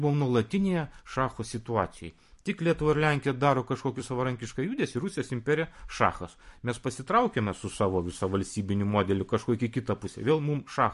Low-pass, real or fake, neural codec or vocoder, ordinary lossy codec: 14.4 kHz; fake; vocoder, 44.1 kHz, 128 mel bands every 256 samples, BigVGAN v2; MP3, 48 kbps